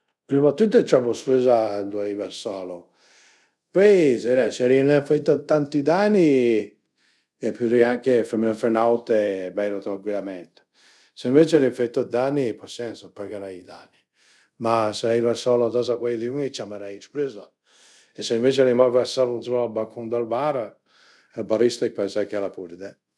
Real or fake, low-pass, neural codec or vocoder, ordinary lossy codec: fake; none; codec, 24 kHz, 0.5 kbps, DualCodec; none